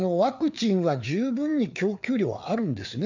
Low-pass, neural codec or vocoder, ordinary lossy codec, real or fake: 7.2 kHz; codec, 16 kHz, 4 kbps, FreqCodec, larger model; none; fake